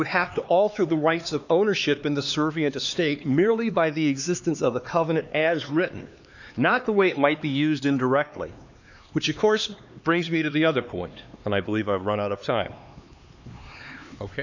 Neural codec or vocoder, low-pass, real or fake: codec, 16 kHz, 2 kbps, X-Codec, HuBERT features, trained on LibriSpeech; 7.2 kHz; fake